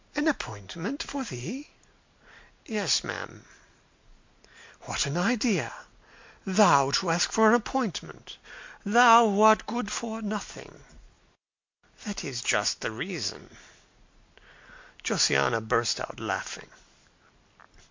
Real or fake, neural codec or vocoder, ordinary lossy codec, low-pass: real; none; MP3, 48 kbps; 7.2 kHz